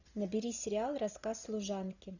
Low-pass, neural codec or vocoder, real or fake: 7.2 kHz; none; real